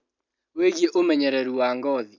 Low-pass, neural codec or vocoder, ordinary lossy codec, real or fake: 7.2 kHz; none; none; real